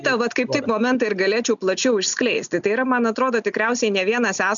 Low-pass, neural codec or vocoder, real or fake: 7.2 kHz; none; real